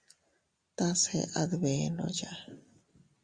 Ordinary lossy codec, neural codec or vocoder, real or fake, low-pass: Opus, 64 kbps; none; real; 9.9 kHz